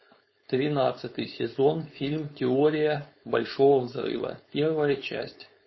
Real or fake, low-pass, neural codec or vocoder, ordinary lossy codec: fake; 7.2 kHz; codec, 16 kHz, 4.8 kbps, FACodec; MP3, 24 kbps